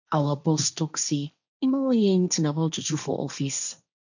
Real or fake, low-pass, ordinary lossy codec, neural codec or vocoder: fake; 7.2 kHz; none; codec, 16 kHz, 1.1 kbps, Voila-Tokenizer